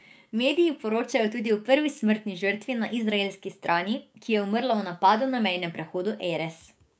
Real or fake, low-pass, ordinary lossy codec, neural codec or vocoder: fake; none; none; codec, 16 kHz, 6 kbps, DAC